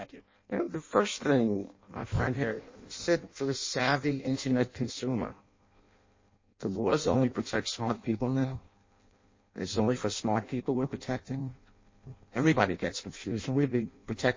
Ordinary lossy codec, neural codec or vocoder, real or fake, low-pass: MP3, 32 kbps; codec, 16 kHz in and 24 kHz out, 0.6 kbps, FireRedTTS-2 codec; fake; 7.2 kHz